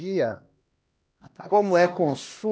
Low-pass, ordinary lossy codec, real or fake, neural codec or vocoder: none; none; fake; codec, 16 kHz, 1 kbps, X-Codec, HuBERT features, trained on LibriSpeech